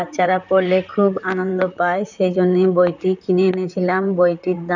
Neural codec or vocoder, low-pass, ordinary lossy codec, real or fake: vocoder, 44.1 kHz, 128 mel bands, Pupu-Vocoder; 7.2 kHz; none; fake